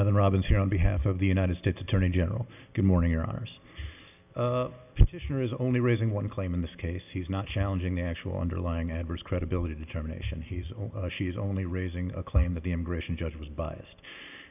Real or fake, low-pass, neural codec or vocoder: real; 3.6 kHz; none